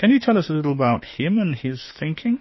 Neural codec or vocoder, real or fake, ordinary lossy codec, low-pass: autoencoder, 48 kHz, 32 numbers a frame, DAC-VAE, trained on Japanese speech; fake; MP3, 24 kbps; 7.2 kHz